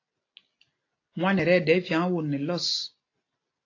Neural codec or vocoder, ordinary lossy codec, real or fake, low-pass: none; AAC, 32 kbps; real; 7.2 kHz